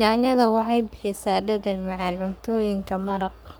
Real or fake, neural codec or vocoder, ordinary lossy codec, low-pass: fake; codec, 44.1 kHz, 3.4 kbps, Pupu-Codec; none; none